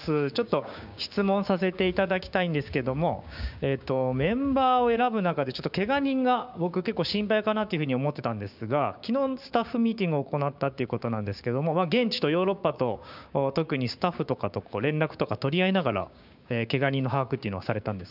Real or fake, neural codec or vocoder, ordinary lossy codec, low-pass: fake; codec, 16 kHz, 6 kbps, DAC; none; 5.4 kHz